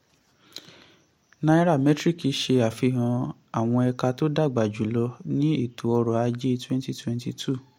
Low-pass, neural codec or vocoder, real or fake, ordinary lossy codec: 19.8 kHz; none; real; MP3, 64 kbps